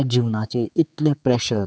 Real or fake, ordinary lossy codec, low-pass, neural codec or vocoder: fake; none; none; codec, 16 kHz, 4 kbps, X-Codec, HuBERT features, trained on general audio